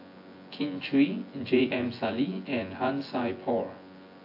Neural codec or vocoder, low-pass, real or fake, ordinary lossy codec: vocoder, 24 kHz, 100 mel bands, Vocos; 5.4 kHz; fake; AAC, 32 kbps